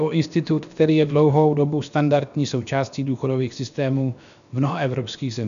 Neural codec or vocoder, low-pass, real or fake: codec, 16 kHz, about 1 kbps, DyCAST, with the encoder's durations; 7.2 kHz; fake